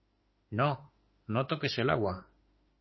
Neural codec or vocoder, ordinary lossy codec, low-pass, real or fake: autoencoder, 48 kHz, 32 numbers a frame, DAC-VAE, trained on Japanese speech; MP3, 24 kbps; 7.2 kHz; fake